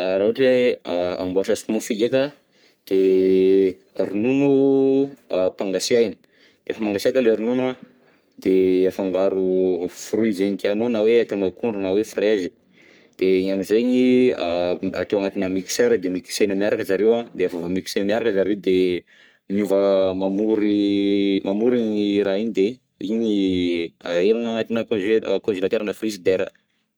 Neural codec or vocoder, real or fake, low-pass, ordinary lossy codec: codec, 44.1 kHz, 3.4 kbps, Pupu-Codec; fake; none; none